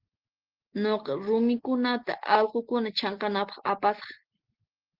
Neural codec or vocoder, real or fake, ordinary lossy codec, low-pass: none; real; Opus, 16 kbps; 5.4 kHz